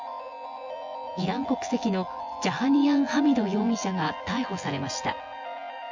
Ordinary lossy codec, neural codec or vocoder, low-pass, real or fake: Opus, 64 kbps; vocoder, 24 kHz, 100 mel bands, Vocos; 7.2 kHz; fake